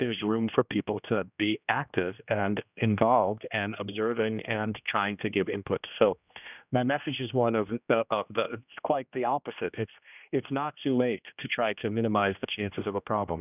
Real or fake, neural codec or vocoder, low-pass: fake; codec, 16 kHz, 1 kbps, X-Codec, HuBERT features, trained on general audio; 3.6 kHz